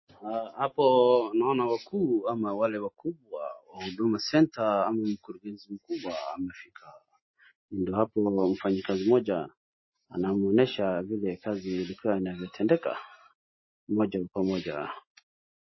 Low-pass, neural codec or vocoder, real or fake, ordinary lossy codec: 7.2 kHz; none; real; MP3, 24 kbps